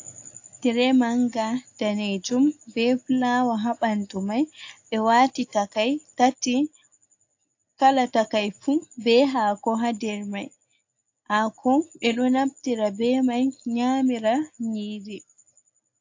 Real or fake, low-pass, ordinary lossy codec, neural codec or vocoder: real; 7.2 kHz; AAC, 48 kbps; none